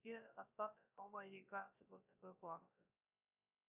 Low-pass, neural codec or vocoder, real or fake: 3.6 kHz; codec, 16 kHz, 0.3 kbps, FocalCodec; fake